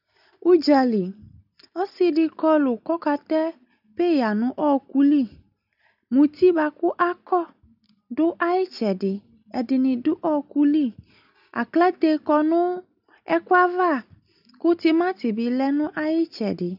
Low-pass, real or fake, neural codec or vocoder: 5.4 kHz; real; none